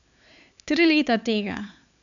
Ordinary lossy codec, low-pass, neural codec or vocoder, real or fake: none; 7.2 kHz; codec, 16 kHz, 4 kbps, X-Codec, HuBERT features, trained on LibriSpeech; fake